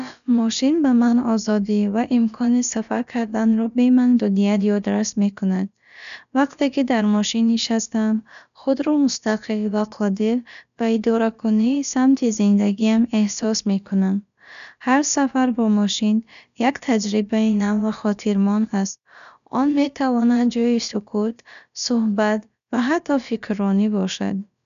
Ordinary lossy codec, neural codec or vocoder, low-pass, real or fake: none; codec, 16 kHz, about 1 kbps, DyCAST, with the encoder's durations; 7.2 kHz; fake